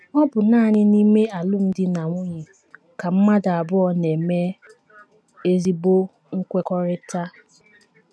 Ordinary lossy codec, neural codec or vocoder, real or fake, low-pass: none; none; real; none